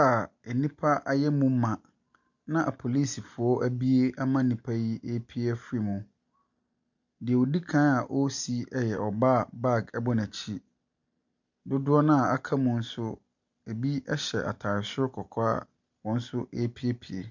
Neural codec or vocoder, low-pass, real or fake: none; 7.2 kHz; real